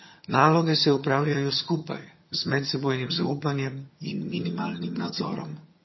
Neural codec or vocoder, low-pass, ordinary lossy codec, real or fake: vocoder, 22.05 kHz, 80 mel bands, HiFi-GAN; 7.2 kHz; MP3, 24 kbps; fake